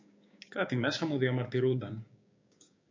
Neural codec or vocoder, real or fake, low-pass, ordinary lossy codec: codec, 16 kHz, 6 kbps, DAC; fake; 7.2 kHz; MP3, 48 kbps